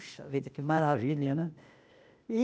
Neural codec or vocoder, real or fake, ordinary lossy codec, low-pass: codec, 16 kHz, 0.8 kbps, ZipCodec; fake; none; none